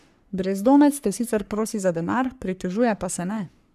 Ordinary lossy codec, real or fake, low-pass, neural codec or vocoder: none; fake; 14.4 kHz; codec, 44.1 kHz, 3.4 kbps, Pupu-Codec